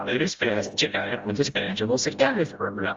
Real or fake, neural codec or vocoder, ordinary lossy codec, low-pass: fake; codec, 16 kHz, 0.5 kbps, FreqCodec, smaller model; Opus, 32 kbps; 7.2 kHz